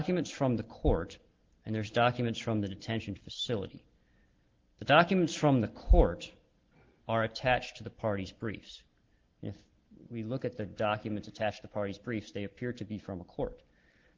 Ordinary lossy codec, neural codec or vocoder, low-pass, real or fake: Opus, 16 kbps; none; 7.2 kHz; real